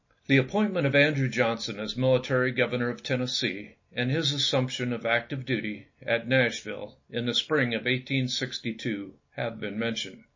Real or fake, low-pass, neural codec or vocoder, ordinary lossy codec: real; 7.2 kHz; none; MP3, 32 kbps